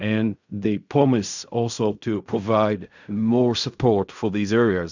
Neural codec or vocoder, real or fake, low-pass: codec, 16 kHz in and 24 kHz out, 0.4 kbps, LongCat-Audio-Codec, fine tuned four codebook decoder; fake; 7.2 kHz